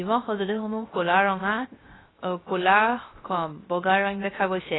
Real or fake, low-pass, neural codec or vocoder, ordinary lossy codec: fake; 7.2 kHz; codec, 16 kHz, 0.3 kbps, FocalCodec; AAC, 16 kbps